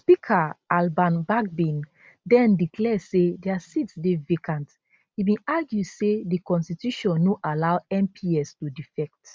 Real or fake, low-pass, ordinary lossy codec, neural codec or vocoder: real; none; none; none